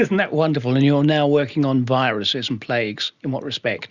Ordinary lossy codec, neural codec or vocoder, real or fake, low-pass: Opus, 64 kbps; none; real; 7.2 kHz